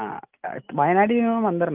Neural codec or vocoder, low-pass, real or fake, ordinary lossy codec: none; 3.6 kHz; real; Opus, 32 kbps